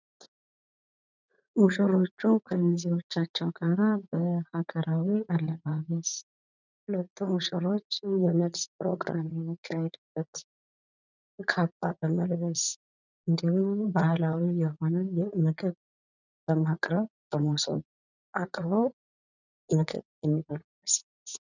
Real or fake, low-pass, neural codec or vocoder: fake; 7.2 kHz; vocoder, 44.1 kHz, 80 mel bands, Vocos